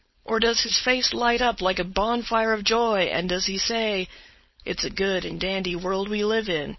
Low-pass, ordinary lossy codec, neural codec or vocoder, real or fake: 7.2 kHz; MP3, 24 kbps; codec, 16 kHz, 4.8 kbps, FACodec; fake